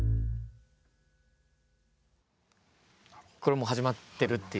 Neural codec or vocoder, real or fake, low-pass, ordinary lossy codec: none; real; none; none